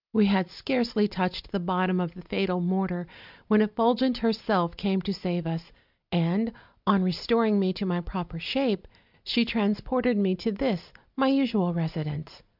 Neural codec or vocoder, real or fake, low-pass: none; real; 5.4 kHz